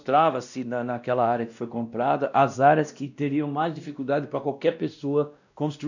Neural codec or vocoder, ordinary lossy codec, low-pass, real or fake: codec, 16 kHz, 1 kbps, X-Codec, WavLM features, trained on Multilingual LibriSpeech; none; 7.2 kHz; fake